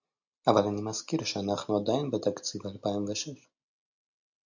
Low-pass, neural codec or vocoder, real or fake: 7.2 kHz; none; real